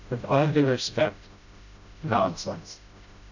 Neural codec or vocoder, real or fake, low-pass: codec, 16 kHz, 0.5 kbps, FreqCodec, smaller model; fake; 7.2 kHz